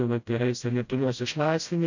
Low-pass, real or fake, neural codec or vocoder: 7.2 kHz; fake; codec, 16 kHz, 0.5 kbps, FreqCodec, smaller model